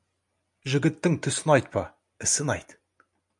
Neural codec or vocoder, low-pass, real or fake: none; 10.8 kHz; real